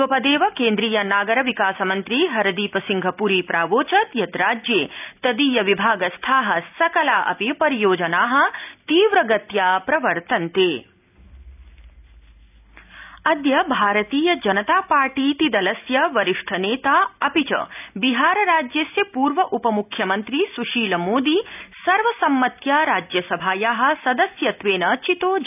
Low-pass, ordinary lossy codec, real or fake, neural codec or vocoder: 3.6 kHz; none; real; none